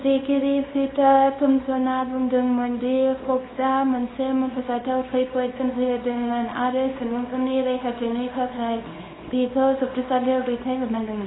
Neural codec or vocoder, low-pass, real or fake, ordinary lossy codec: codec, 24 kHz, 0.9 kbps, WavTokenizer, small release; 7.2 kHz; fake; AAC, 16 kbps